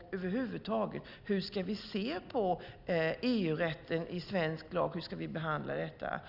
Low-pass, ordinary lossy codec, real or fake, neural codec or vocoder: 5.4 kHz; none; real; none